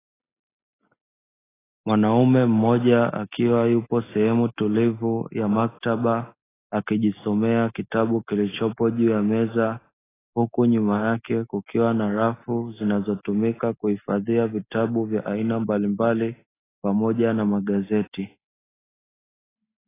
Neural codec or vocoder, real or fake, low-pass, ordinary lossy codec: none; real; 3.6 kHz; AAC, 16 kbps